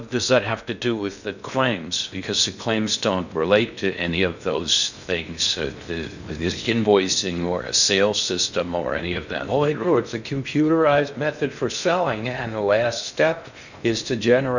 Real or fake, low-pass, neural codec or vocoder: fake; 7.2 kHz; codec, 16 kHz in and 24 kHz out, 0.6 kbps, FocalCodec, streaming, 2048 codes